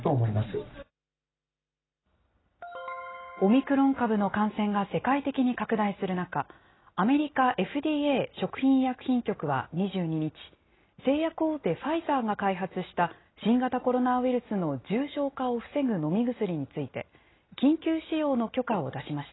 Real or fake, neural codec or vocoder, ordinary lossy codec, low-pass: real; none; AAC, 16 kbps; 7.2 kHz